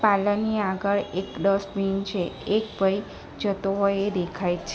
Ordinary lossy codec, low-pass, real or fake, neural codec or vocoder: none; none; real; none